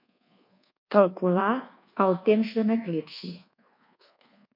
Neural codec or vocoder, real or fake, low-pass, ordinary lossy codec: codec, 24 kHz, 1.2 kbps, DualCodec; fake; 5.4 kHz; MP3, 48 kbps